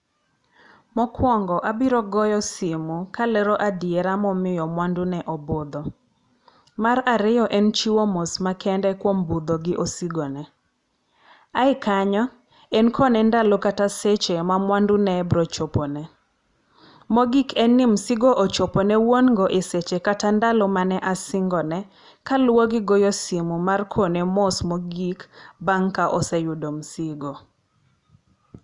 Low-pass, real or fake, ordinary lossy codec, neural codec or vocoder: 10.8 kHz; real; Opus, 64 kbps; none